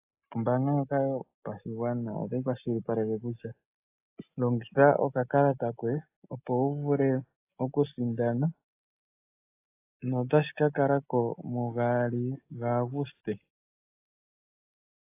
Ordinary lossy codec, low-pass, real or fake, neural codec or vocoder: AAC, 24 kbps; 3.6 kHz; real; none